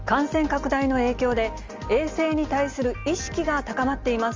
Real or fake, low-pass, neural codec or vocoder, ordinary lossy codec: real; 7.2 kHz; none; Opus, 32 kbps